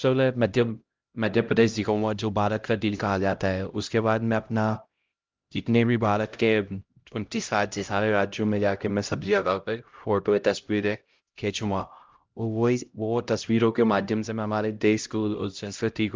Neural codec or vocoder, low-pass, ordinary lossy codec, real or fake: codec, 16 kHz, 0.5 kbps, X-Codec, HuBERT features, trained on LibriSpeech; 7.2 kHz; Opus, 32 kbps; fake